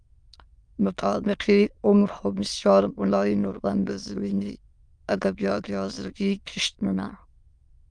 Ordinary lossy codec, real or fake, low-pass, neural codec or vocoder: Opus, 32 kbps; fake; 9.9 kHz; autoencoder, 22.05 kHz, a latent of 192 numbers a frame, VITS, trained on many speakers